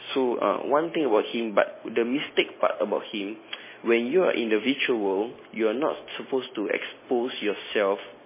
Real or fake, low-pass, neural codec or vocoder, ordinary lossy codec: real; 3.6 kHz; none; MP3, 16 kbps